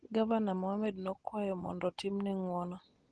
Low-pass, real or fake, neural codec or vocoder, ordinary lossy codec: 9.9 kHz; real; none; Opus, 16 kbps